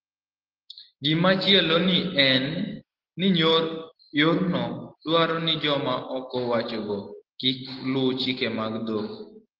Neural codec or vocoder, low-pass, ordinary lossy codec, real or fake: none; 5.4 kHz; Opus, 16 kbps; real